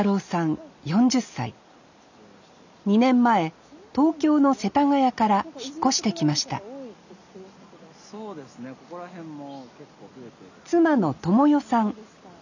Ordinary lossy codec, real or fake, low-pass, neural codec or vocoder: none; real; 7.2 kHz; none